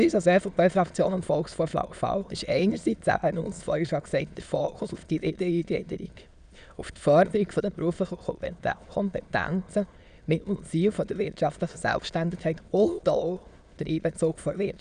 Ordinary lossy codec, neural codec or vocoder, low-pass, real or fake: none; autoencoder, 22.05 kHz, a latent of 192 numbers a frame, VITS, trained on many speakers; 9.9 kHz; fake